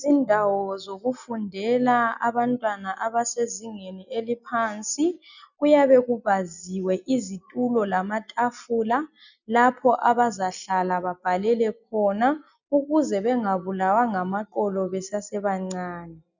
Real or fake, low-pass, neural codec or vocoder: real; 7.2 kHz; none